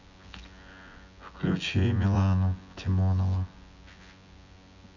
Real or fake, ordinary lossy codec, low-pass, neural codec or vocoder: fake; none; 7.2 kHz; vocoder, 24 kHz, 100 mel bands, Vocos